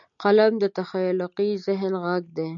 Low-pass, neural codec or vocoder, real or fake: 7.2 kHz; none; real